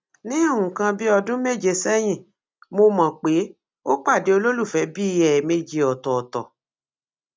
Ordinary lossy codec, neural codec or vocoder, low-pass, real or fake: none; none; none; real